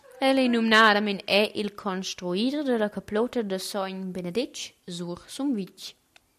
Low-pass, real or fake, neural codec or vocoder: 14.4 kHz; real; none